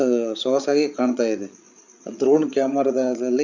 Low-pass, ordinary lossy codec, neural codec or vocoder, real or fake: 7.2 kHz; none; codec, 16 kHz, 8 kbps, FreqCodec, larger model; fake